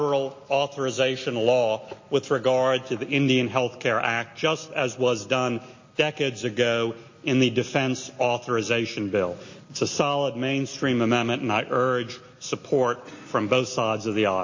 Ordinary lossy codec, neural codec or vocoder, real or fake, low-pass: MP3, 32 kbps; none; real; 7.2 kHz